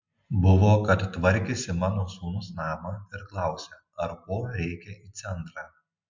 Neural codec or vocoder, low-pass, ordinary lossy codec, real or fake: none; 7.2 kHz; MP3, 64 kbps; real